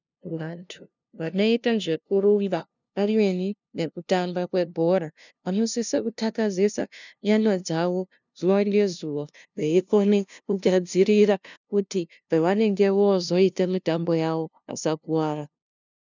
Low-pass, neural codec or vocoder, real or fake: 7.2 kHz; codec, 16 kHz, 0.5 kbps, FunCodec, trained on LibriTTS, 25 frames a second; fake